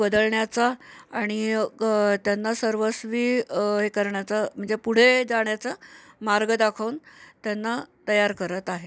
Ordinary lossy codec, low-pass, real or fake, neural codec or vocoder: none; none; real; none